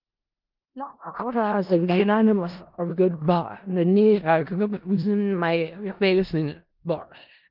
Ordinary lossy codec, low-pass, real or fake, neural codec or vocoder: Opus, 32 kbps; 5.4 kHz; fake; codec, 16 kHz in and 24 kHz out, 0.4 kbps, LongCat-Audio-Codec, four codebook decoder